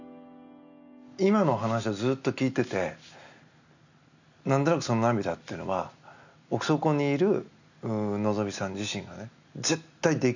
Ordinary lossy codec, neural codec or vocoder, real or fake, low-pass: none; none; real; 7.2 kHz